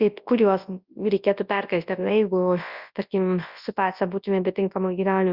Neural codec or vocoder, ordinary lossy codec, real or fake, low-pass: codec, 24 kHz, 0.9 kbps, WavTokenizer, large speech release; Opus, 64 kbps; fake; 5.4 kHz